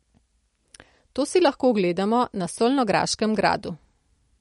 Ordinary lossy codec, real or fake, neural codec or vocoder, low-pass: MP3, 48 kbps; real; none; 19.8 kHz